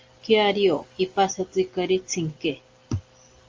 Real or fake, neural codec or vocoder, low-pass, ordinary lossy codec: real; none; 7.2 kHz; Opus, 32 kbps